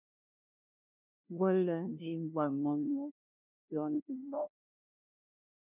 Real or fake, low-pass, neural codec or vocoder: fake; 3.6 kHz; codec, 16 kHz, 0.5 kbps, FunCodec, trained on LibriTTS, 25 frames a second